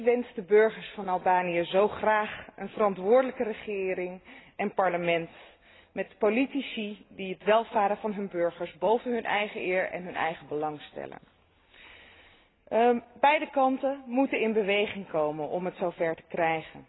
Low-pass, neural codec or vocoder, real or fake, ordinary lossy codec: 7.2 kHz; none; real; AAC, 16 kbps